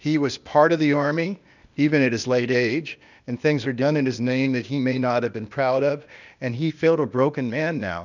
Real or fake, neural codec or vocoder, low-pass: fake; codec, 16 kHz, 0.8 kbps, ZipCodec; 7.2 kHz